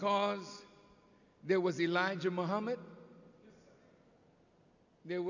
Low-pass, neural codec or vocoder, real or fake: 7.2 kHz; none; real